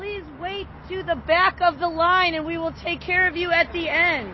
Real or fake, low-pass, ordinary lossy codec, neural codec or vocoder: real; 7.2 kHz; MP3, 24 kbps; none